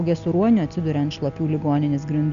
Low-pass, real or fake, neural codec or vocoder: 7.2 kHz; real; none